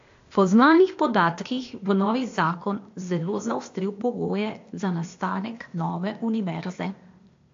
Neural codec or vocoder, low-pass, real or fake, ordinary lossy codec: codec, 16 kHz, 0.8 kbps, ZipCodec; 7.2 kHz; fake; AAC, 48 kbps